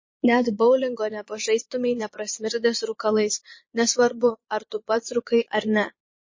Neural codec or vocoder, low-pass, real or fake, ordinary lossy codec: vocoder, 22.05 kHz, 80 mel bands, Vocos; 7.2 kHz; fake; MP3, 32 kbps